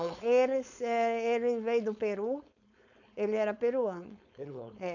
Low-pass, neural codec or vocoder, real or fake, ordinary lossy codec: 7.2 kHz; codec, 16 kHz, 4.8 kbps, FACodec; fake; none